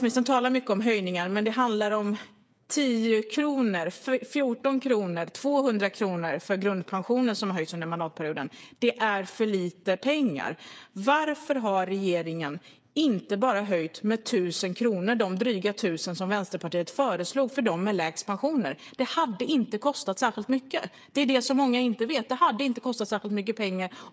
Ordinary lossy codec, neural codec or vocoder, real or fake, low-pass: none; codec, 16 kHz, 8 kbps, FreqCodec, smaller model; fake; none